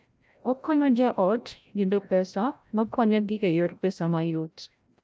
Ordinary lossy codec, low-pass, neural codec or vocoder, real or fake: none; none; codec, 16 kHz, 0.5 kbps, FreqCodec, larger model; fake